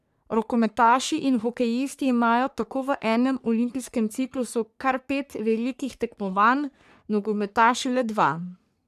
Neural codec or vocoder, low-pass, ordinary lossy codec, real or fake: codec, 44.1 kHz, 3.4 kbps, Pupu-Codec; 14.4 kHz; none; fake